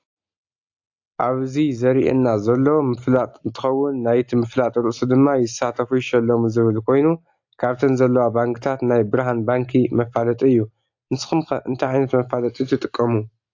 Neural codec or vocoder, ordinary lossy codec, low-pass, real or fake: none; AAC, 48 kbps; 7.2 kHz; real